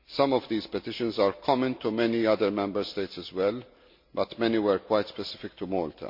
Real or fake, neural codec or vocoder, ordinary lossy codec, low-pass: real; none; MP3, 32 kbps; 5.4 kHz